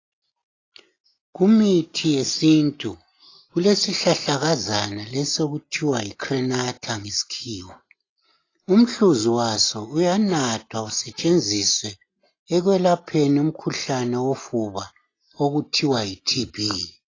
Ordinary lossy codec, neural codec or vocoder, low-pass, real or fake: AAC, 32 kbps; none; 7.2 kHz; real